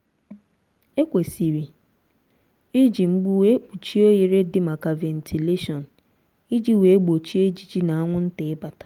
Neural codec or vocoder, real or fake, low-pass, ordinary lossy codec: none; real; 19.8 kHz; Opus, 32 kbps